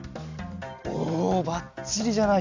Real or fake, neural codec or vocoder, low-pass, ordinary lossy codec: real; none; 7.2 kHz; AAC, 48 kbps